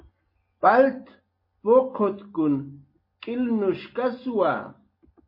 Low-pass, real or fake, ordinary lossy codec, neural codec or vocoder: 5.4 kHz; real; MP3, 24 kbps; none